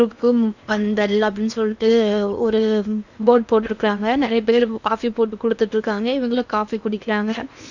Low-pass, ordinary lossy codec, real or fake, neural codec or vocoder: 7.2 kHz; none; fake; codec, 16 kHz in and 24 kHz out, 0.8 kbps, FocalCodec, streaming, 65536 codes